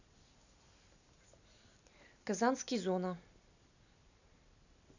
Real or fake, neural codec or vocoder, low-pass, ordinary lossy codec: real; none; 7.2 kHz; none